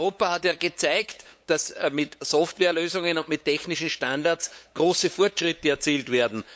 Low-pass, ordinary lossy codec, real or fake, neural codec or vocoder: none; none; fake; codec, 16 kHz, 8 kbps, FunCodec, trained on LibriTTS, 25 frames a second